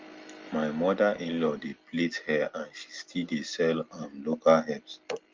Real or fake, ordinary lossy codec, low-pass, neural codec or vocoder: real; Opus, 32 kbps; 7.2 kHz; none